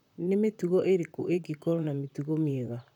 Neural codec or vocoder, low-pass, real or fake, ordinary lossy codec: vocoder, 44.1 kHz, 128 mel bands, Pupu-Vocoder; 19.8 kHz; fake; none